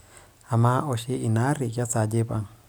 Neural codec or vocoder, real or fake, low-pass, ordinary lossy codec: none; real; none; none